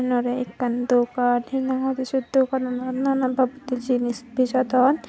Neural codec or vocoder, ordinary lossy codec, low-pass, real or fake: none; none; none; real